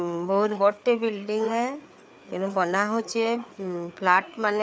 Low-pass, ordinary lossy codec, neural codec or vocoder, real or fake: none; none; codec, 16 kHz, 4 kbps, FreqCodec, larger model; fake